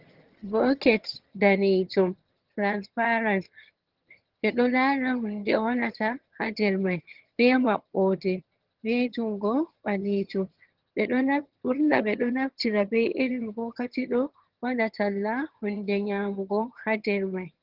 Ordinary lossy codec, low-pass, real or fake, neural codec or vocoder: Opus, 16 kbps; 5.4 kHz; fake; vocoder, 22.05 kHz, 80 mel bands, HiFi-GAN